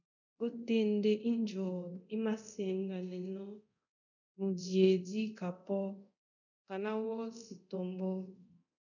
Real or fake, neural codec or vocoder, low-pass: fake; codec, 24 kHz, 0.9 kbps, DualCodec; 7.2 kHz